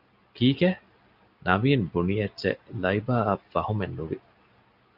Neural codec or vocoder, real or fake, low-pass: none; real; 5.4 kHz